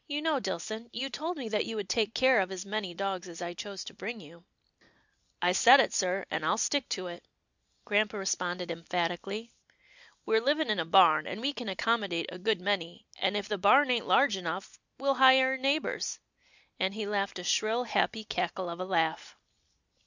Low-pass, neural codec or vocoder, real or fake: 7.2 kHz; none; real